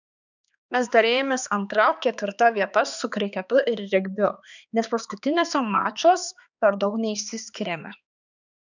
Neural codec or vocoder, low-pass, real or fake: codec, 16 kHz, 2 kbps, X-Codec, HuBERT features, trained on balanced general audio; 7.2 kHz; fake